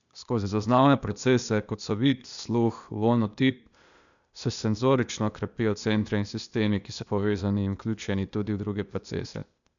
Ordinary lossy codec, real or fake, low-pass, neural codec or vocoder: none; fake; 7.2 kHz; codec, 16 kHz, 0.8 kbps, ZipCodec